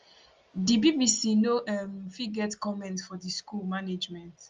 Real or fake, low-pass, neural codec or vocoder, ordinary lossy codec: real; 7.2 kHz; none; Opus, 32 kbps